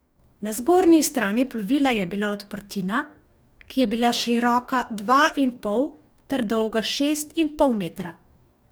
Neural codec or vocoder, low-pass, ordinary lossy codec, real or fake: codec, 44.1 kHz, 2.6 kbps, DAC; none; none; fake